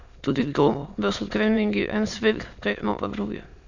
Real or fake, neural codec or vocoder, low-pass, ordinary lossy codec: fake; autoencoder, 22.05 kHz, a latent of 192 numbers a frame, VITS, trained on many speakers; 7.2 kHz; none